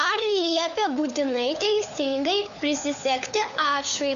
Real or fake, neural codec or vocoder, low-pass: fake; codec, 16 kHz, 4 kbps, FunCodec, trained on LibriTTS, 50 frames a second; 7.2 kHz